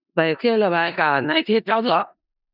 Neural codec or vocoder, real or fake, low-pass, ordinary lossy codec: codec, 16 kHz in and 24 kHz out, 0.4 kbps, LongCat-Audio-Codec, four codebook decoder; fake; 5.4 kHz; none